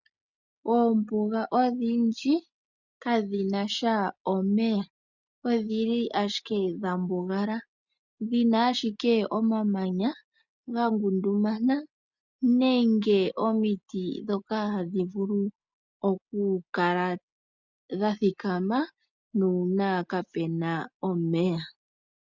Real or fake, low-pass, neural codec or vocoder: real; 7.2 kHz; none